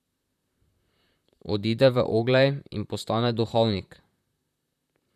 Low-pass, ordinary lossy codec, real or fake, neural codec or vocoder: 14.4 kHz; none; fake; vocoder, 44.1 kHz, 128 mel bands, Pupu-Vocoder